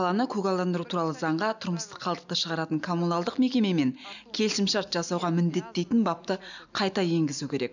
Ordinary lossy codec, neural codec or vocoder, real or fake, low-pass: none; none; real; 7.2 kHz